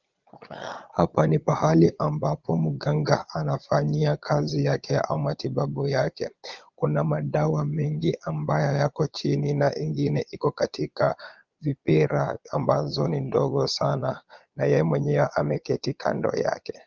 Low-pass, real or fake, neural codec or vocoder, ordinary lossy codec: 7.2 kHz; fake; vocoder, 22.05 kHz, 80 mel bands, Vocos; Opus, 32 kbps